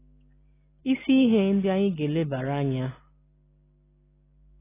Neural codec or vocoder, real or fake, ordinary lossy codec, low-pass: none; real; AAC, 16 kbps; 3.6 kHz